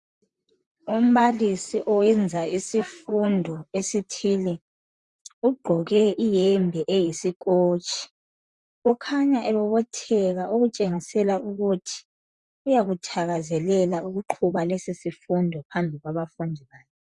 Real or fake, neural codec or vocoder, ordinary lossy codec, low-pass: fake; vocoder, 44.1 kHz, 128 mel bands, Pupu-Vocoder; Opus, 64 kbps; 10.8 kHz